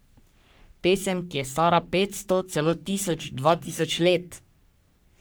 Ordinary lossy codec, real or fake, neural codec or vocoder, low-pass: none; fake; codec, 44.1 kHz, 3.4 kbps, Pupu-Codec; none